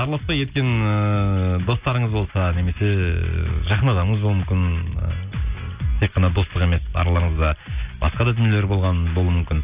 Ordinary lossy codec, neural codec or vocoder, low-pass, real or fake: Opus, 64 kbps; none; 3.6 kHz; real